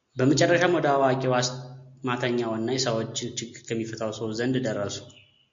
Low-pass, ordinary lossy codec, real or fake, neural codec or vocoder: 7.2 kHz; MP3, 48 kbps; real; none